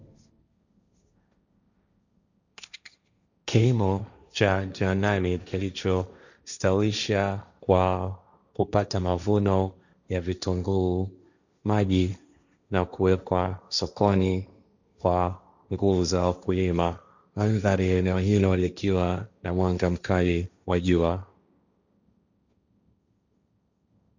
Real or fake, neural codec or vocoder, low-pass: fake; codec, 16 kHz, 1.1 kbps, Voila-Tokenizer; 7.2 kHz